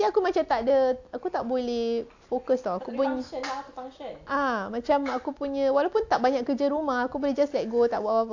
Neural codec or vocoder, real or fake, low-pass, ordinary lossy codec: none; real; 7.2 kHz; AAC, 48 kbps